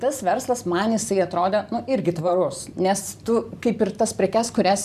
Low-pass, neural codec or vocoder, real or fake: 14.4 kHz; vocoder, 44.1 kHz, 128 mel bands every 512 samples, BigVGAN v2; fake